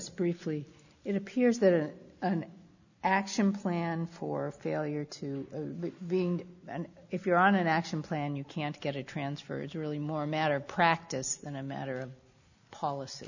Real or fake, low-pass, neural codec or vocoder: real; 7.2 kHz; none